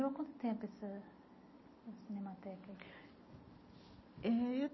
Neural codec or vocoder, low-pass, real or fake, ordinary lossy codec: none; 7.2 kHz; real; MP3, 24 kbps